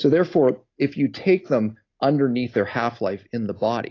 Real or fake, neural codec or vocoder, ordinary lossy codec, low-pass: real; none; AAC, 32 kbps; 7.2 kHz